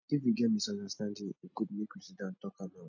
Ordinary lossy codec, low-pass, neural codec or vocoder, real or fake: none; 7.2 kHz; none; real